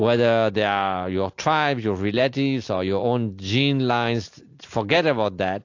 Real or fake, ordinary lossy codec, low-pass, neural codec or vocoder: real; AAC, 48 kbps; 7.2 kHz; none